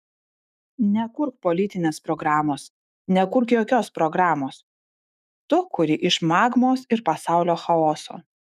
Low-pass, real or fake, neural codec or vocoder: 14.4 kHz; fake; autoencoder, 48 kHz, 128 numbers a frame, DAC-VAE, trained on Japanese speech